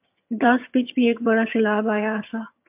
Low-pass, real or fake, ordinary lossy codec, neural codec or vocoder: 3.6 kHz; fake; MP3, 32 kbps; vocoder, 22.05 kHz, 80 mel bands, HiFi-GAN